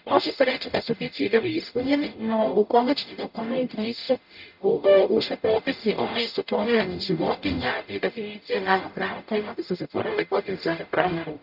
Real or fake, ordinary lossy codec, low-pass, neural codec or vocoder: fake; none; 5.4 kHz; codec, 44.1 kHz, 0.9 kbps, DAC